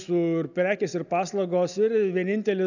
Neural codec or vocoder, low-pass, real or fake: none; 7.2 kHz; real